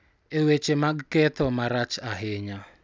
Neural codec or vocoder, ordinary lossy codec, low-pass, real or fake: none; none; none; real